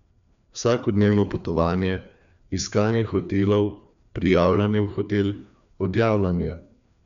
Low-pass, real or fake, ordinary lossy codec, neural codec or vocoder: 7.2 kHz; fake; none; codec, 16 kHz, 2 kbps, FreqCodec, larger model